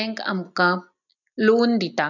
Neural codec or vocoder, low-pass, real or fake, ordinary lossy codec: none; 7.2 kHz; real; none